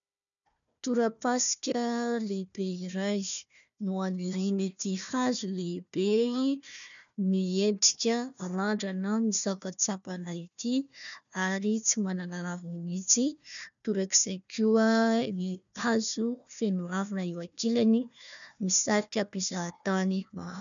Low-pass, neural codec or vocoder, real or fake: 7.2 kHz; codec, 16 kHz, 1 kbps, FunCodec, trained on Chinese and English, 50 frames a second; fake